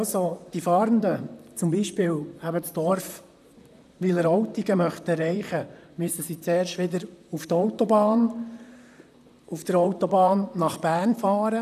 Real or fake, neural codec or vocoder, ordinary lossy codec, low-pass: fake; vocoder, 44.1 kHz, 128 mel bands, Pupu-Vocoder; none; 14.4 kHz